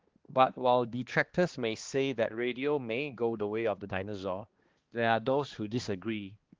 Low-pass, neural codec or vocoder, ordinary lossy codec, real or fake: 7.2 kHz; codec, 16 kHz, 2 kbps, X-Codec, HuBERT features, trained on balanced general audio; Opus, 16 kbps; fake